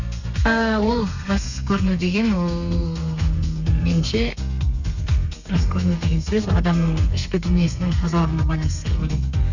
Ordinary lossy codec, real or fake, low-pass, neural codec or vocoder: none; fake; 7.2 kHz; codec, 32 kHz, 1.9 kbps, SNAC